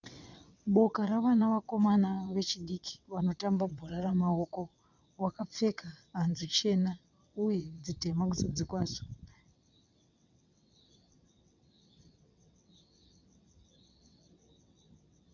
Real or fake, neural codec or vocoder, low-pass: fake; vocoder, 22.05 kHz, 80 mel bands, WaveNeXt; 7.2 kHz